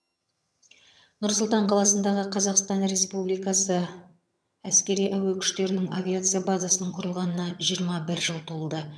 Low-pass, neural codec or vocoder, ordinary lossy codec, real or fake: none; vocoder, 22.05 kHz, 80 mel bands, HiFi-GAN; none; fake